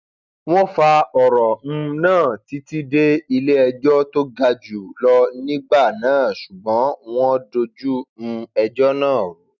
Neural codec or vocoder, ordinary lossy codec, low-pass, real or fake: none; none; 7.2 kHz; real